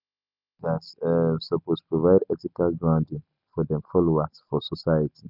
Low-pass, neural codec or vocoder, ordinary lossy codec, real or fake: 5.4 kHz; none; none; real